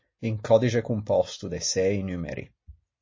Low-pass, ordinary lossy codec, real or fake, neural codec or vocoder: 7.2 kHz; MP3, 32 kbps; real; none